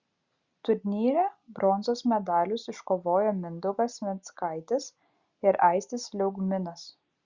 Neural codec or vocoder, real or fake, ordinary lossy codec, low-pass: none; real; Opus, 64 kbps; 7.2 kHz